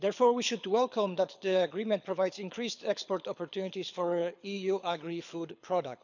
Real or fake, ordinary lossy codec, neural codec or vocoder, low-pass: fake; none; codec, 24 kHz, 6 kbps, HILCodec; 7.2 kHz